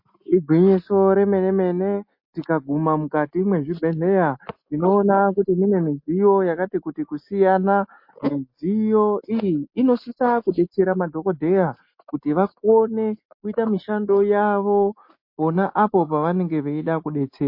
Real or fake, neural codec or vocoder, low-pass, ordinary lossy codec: real; none; 5.4 kHz; MP3, 32 kbps